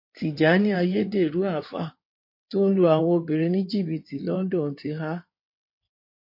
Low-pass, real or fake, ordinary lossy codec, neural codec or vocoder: 5.4 kHz; fake; MP3, 32 kbps; codec, 16 kHz in and 24 kHz out, 2.2 kbps, FireRedTTS-2 codec